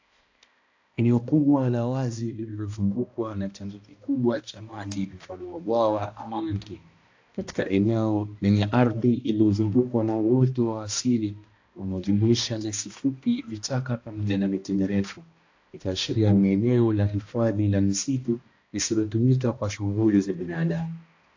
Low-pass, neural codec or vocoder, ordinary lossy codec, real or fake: 7.2 kHz; codec, 16 kHz, 1 kbps, X-Codec, HuBERT features, trained on balanced general audio; AAC, 48 kbps; fake